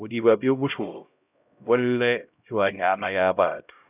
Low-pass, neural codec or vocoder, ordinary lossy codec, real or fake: 3.6 kHz; codec, 16 kHz, 0.5 kbps, X-Codec, HuBERT features, trained on LibriSpeech; none; fake